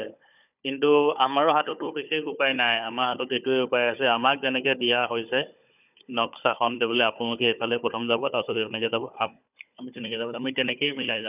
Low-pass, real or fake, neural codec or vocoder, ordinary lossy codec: 3.6 kHz; fake; codec, 16 kHz, 4 kbps, FunCodec, trained on Chinese and English, 50 frames a second; none